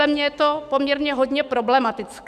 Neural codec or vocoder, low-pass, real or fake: autoencoder, 48 kHz, 128 numbers a frame, DAC-VAE, trained on Japanese speech; 14.4 kHz; fake